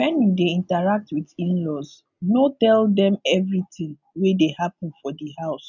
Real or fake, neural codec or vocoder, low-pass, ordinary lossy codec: real; none; 7.2 kHz; none